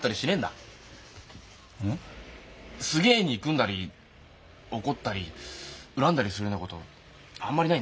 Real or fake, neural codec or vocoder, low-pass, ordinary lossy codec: real; none; none; none